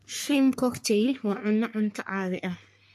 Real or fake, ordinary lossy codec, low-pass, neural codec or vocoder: fake; MP3, 64 kbps; 14.4 kHz; codec, 44.1 kHz, 3.4 kbps, Pupu-Codec